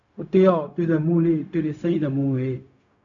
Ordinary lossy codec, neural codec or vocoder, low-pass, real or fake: MP3, 96 kbps; codec, 16 kHz, 0.4 kbps, LongCat-Audio-Codec; 7.2 kHz; fake